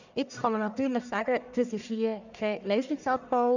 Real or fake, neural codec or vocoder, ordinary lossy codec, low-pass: fake; codec, 44.1 kHz, 1.7 kbps, Pupu-Codec; none; 7.2 kHz